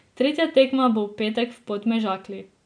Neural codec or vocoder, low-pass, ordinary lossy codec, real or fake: none; 9.9 kHz; none; real